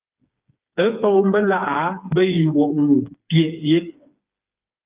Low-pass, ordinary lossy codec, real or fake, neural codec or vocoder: 3.6 kHz; Opus, 24 kbps; fake; codec, 16 kHz, 4 kbps, FreqCodec, smaller model